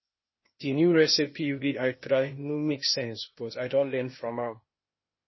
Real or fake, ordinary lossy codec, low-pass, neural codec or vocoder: fake; MP3, 24 kbps; 7.2 kHz; codec, 16 kHz, 0.8 kbps, ZipCodec